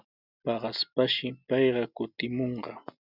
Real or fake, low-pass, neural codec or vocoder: real; 5.4 kHz; none